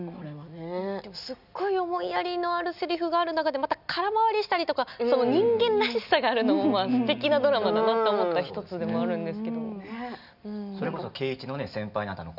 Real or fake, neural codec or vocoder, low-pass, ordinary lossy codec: real; none; 5.4 kHz; none